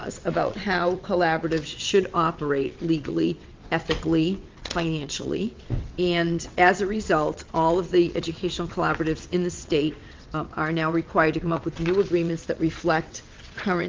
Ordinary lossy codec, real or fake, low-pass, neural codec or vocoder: Opus, 32 kbps; fake; 7.2 kHz; vocoder, 22.05 kHz, 80 mel bands, WaveNeXt